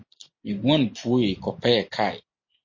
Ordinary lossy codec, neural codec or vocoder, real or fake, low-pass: MP3, 32 kbps; none; real; 7.2 kHz